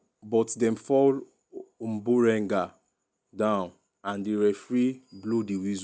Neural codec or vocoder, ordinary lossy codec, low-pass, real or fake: none; none; none; real